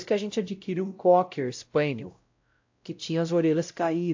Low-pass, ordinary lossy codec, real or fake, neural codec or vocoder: 7.2 kHz; AAC, 48 kbps; fake; codec, 16 kHz, 0.5 kbps, X-Codec, WavLM features, trained on Multilingual LibriSpeech